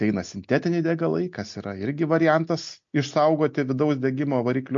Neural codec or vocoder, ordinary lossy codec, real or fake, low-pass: none; MP3, 48 kbps; real; 7.2 kHz